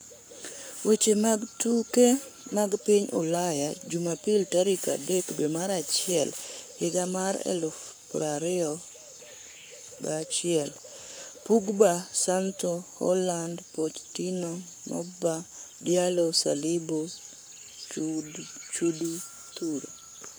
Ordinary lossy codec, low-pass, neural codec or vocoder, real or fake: none; none; codec, 44.1 kHz, 7.8 kbps, Pupu-Codec; fake